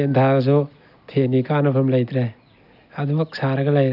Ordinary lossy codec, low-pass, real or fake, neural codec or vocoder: AAC, 48 kbps; 5.4 kHz; real; none